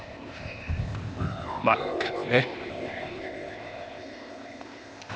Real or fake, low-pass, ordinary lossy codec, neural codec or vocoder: fake; none; none; codec, 16 kHz, 0.8 kbps, ZipCodec